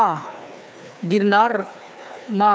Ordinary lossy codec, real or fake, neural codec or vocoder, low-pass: none; fake; codec, 16 kHz, 2 kbps, FreqCodec, larger model; none